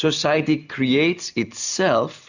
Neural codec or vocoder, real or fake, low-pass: none; real; 7.2 kHz